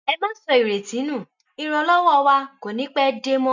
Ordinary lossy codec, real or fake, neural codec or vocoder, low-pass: none; real; none; 7.2 kHz